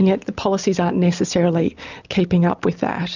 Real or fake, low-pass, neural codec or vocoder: real; 7.2 kHz; none